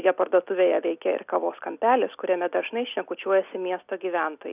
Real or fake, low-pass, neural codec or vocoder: real; 3.6 kHz; none